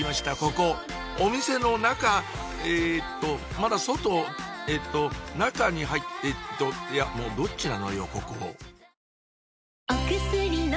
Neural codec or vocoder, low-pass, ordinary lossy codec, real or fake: none; none; none; real